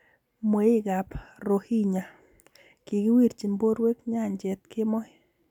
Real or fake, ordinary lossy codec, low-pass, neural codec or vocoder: real; none; 19.8 kHz; none